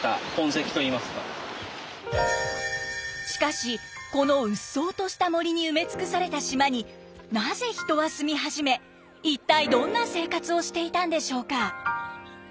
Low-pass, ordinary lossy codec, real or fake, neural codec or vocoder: none; none; real; none